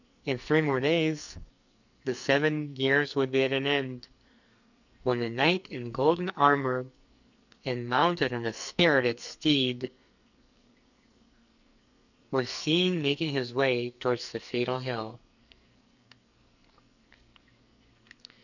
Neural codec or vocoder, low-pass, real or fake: codec, 44.1 kHz, 2.6 kbps, SNAC; 7.2 kHz; fake